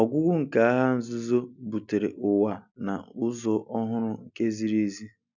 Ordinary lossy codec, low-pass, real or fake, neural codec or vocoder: none; 7.2 kHz; real; none